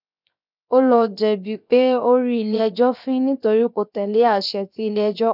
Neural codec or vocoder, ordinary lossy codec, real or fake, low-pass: codec, 16 kHz, 0.7 kbps, FocalCodec; none; fake; 5.4 kHz